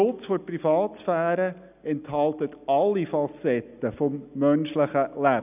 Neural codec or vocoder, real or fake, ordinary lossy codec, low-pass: none; real; none; 3.6 kHz